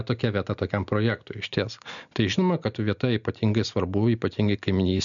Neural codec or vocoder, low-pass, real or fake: none; 7.2 kHz; real